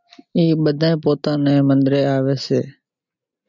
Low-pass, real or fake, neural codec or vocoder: 7.2 kHz; real; none